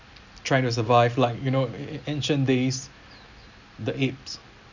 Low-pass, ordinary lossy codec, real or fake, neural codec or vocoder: 7.2 kHz; none; real; none